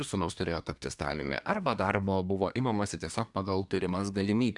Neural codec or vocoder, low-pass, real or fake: codec, 24 kHz, 1 kbps, SNAC; 10.8 kHz; fake